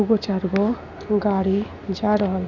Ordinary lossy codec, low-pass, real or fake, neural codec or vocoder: none; 7.2 kHz; real; none